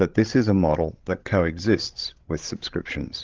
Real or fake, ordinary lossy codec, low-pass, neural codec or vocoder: fake; Opus, 16 kbps; 7.2 kHz; codec, 16 kHz, 16 kbps, FreqCodec, larger model